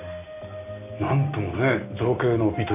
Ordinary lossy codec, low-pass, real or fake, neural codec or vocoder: none; 3.6 kHz; real; none